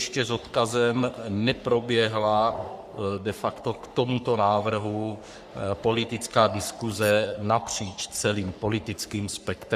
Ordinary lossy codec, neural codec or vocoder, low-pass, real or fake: Opus, 64 kbps; codec, 44.1 kHz, 3.4 kbps, Pupu-Codec; 14.4 kHz; fake